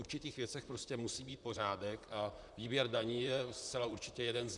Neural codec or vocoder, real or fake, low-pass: vocoder, 44.1 kHz, 128 mel bands, Pupu-Vocoder; fake; 10.8 kHz